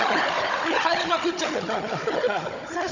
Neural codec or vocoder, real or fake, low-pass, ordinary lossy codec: codec, 16 kHz, 16 kbps, FunCodec, trained on Chinese and English, 50 frames a second; fake; 7.2 kHz; none